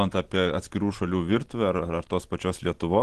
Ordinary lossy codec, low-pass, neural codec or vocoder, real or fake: Opus, 16 kbps; 10.8 kHz; none; real